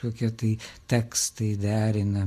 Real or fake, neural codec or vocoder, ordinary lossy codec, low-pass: real; none; MP3, 64 kbps; 14.4 kHz